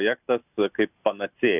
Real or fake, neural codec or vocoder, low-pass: real; none; 3.6 kHz